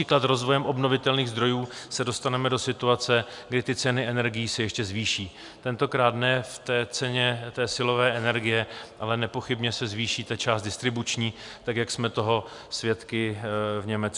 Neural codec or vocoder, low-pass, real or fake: none; 10.8 kHz; real